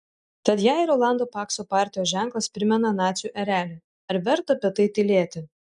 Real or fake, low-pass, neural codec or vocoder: real; 10.8 kHz; none